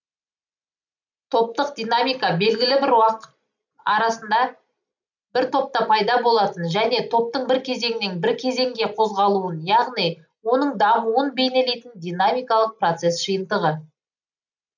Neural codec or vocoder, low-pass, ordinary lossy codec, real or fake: none; 7.2 kHz; none; real